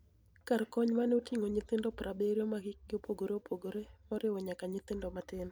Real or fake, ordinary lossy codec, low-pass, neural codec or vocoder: real; none; none; none